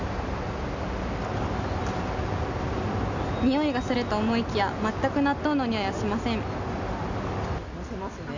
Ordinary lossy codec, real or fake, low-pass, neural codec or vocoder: none; real; 7.2 kHz; none